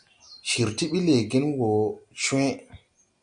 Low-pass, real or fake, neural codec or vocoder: 9.9 kHz; real; none